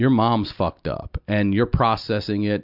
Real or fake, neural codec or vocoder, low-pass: real; none; 5.4 kHz